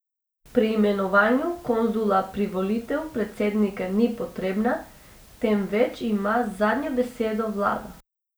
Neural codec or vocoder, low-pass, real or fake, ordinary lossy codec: none; none; real; none